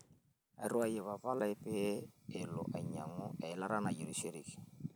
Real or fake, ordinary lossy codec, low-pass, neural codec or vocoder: real; none; none; none